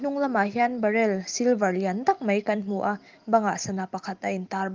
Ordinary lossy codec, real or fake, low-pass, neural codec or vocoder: Opus, 32 kbps; real; 7.2 kHz; none